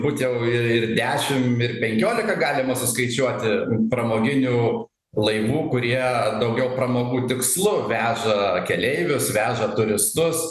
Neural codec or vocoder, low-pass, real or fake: none; 14.4 kHz; real